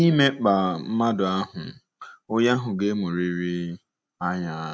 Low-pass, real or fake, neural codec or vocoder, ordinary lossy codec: none; real; none; none